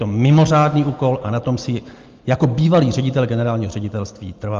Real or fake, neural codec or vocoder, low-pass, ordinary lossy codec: real; none; 7.2 kHz; Opus, 32 kbps